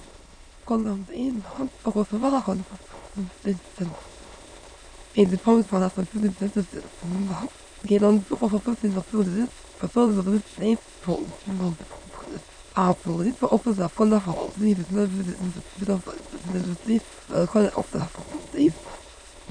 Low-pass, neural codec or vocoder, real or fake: 9.9 kHz; autoencoder, 22.05 kHz, a latent of 192 numbers a frame, VITS, trained on many speakers; fake